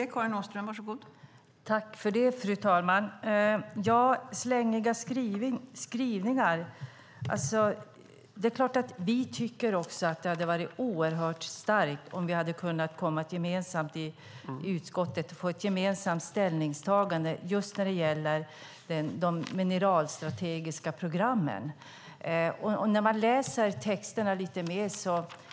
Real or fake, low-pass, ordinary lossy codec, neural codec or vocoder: real; none; none; none